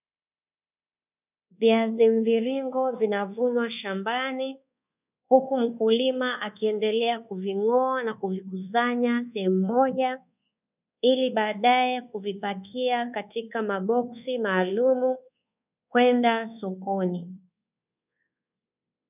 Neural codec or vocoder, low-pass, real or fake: codec, 24 kHz, 1.2 kbps, DualCodec; 3.6 kHz; fake